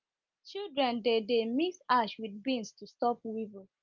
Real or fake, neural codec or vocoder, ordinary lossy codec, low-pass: real; none; Opus, 32 kbps; 7.2 kHz